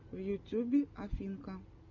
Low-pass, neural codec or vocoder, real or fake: 7.2 kHz; none; real